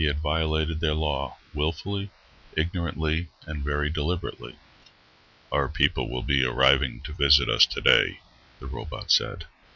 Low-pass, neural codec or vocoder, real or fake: 7.2 kHz; none; real